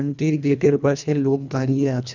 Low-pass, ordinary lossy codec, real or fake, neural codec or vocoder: 7.2 kHz; none; fake; codec, 24 kHz, 1.5 kbps, HILCodec